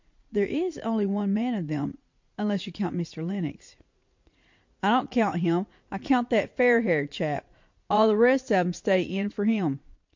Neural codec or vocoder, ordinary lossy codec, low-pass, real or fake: vocoder, 44.1 kHz, 80 mel bands, Vocos; MP3, 48 kbps; 7.2 kHz; fake